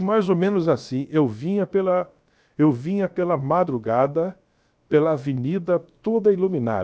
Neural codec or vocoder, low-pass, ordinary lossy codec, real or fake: codec, 16 kHz, about 1 kbps, DyCAST, with the encoder's durations; none; none; fake